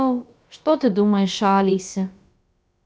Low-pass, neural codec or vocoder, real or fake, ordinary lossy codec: none; codec, 16 kHz, about 1 kbps, DyCAST, with the encoder's durations; fake; none